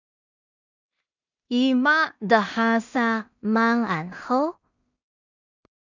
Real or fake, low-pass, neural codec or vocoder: fake; 7.2 kHz; codec, 16 kHz in and 24 kHz out, 0.4 kbps, LongCat-Audio-Codec, two codebook decoder